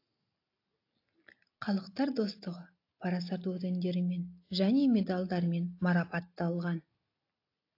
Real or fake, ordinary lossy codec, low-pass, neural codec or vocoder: real; AAC, 32 kbps; 5.4 kHz; none